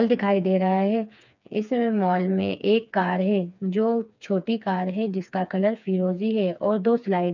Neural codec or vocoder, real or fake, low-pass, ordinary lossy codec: codec, 16 kHz, 4 kbps, FreqCodec, smaller model; fake; 7.2 kHz; none